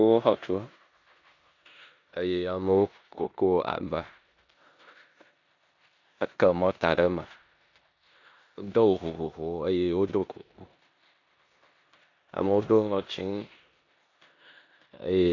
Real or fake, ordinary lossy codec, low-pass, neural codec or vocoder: fake; AAC, 48 kbps; 7.2 kHz; codec, 16 kHz in and 24 kHz out, 0.9 kbps, LongCat-Audio-Codec, four codebook decoder